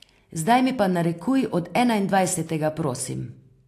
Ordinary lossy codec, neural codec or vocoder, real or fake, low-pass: AAC, 64 kbps; none; real; 14.4 kHz